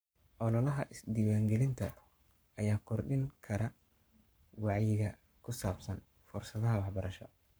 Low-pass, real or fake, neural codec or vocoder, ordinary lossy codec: none; fake; codec, 44.1 kHz, 7.8 kbps, Pupu-Codec; none